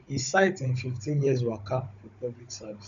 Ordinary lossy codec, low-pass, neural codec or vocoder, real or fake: none; 7.2 kHz; codec, 16 kHz, 16 kbps, FunCodec, trained on Chinese and English, 50 frames a second; fake